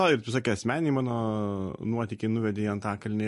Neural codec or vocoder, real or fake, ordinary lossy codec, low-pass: none; real; MP3, 48 kbps; 14.4 kHz